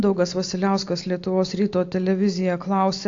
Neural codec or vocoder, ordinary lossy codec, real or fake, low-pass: none; AAC, 48 kbps; real; 7.2 kHz